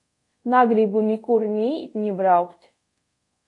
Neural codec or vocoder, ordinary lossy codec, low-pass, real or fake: codec, 24 kHz, 0.5 kbps, DualCodec; AAC, 48 kbps; 10.8 kHz; fake